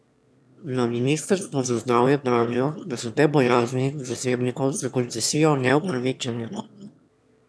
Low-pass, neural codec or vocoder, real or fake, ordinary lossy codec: none; autoencoder, 22.05 kHz, a latent of 192 numbers a frame, VITS, trained on one speaker; fake; none